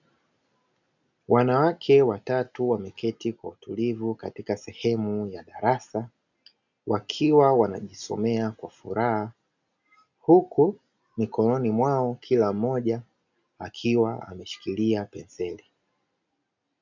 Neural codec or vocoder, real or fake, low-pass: none; real; 7.2 kHz